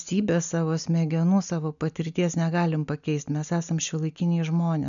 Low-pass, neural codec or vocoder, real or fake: 7.2 kHz; none; real